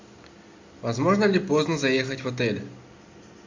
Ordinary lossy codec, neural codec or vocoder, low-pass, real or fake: MP3, 64 kbps; none; 7.2 kHz; real